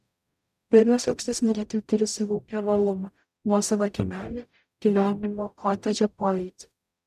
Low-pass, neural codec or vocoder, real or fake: 14.4 kHz; codec, 44.1 kHz, 0.9 kbps, DAC; fake